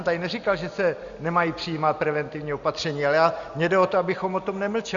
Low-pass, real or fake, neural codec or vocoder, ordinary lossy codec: 7.2 kHz; real; none; Opus, 64 kbps